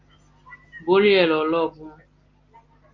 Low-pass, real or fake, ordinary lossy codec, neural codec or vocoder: 7.2 kHz; real; Opus, 32 kbps; none